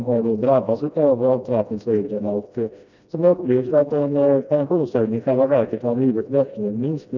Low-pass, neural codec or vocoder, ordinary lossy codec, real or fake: 7.2 kHz; codec, 16 kHz, 1 kbps, FreqCodec, smaller model; none; fake